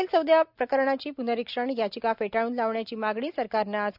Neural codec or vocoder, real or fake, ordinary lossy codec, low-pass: none; real; none; 5.4 kHz